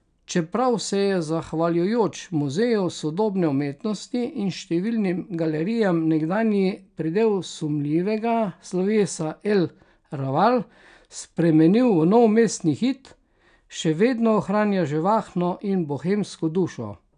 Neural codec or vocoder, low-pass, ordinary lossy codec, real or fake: none; 9.9 kHz; none; real